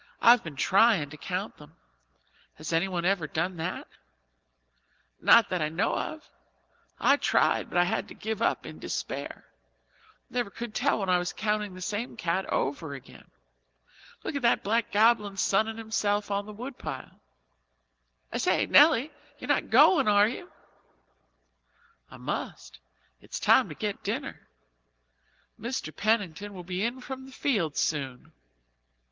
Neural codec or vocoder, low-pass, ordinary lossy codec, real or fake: none; 7.2 kHz; Opus, 16 kbps; real